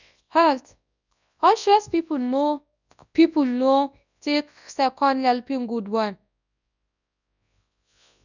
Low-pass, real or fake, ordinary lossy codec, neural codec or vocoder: 7.2 kHz; fake; none; codec, 24 kHz, 0.9 kbps, WavTokenizer, large speech release